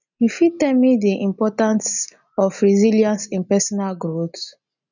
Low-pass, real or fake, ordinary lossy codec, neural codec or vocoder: 7.2 kHz; real; none; none